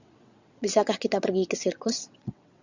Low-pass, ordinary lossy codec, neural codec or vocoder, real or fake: 7.2 kHz; Opus, 64 kbps; vocoder, 22.05 kHz, 80 mel bands, WaveNeXt; fake